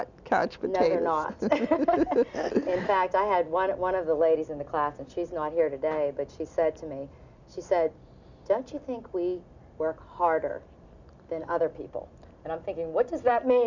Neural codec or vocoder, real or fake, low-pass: none; real; 7.2 kHz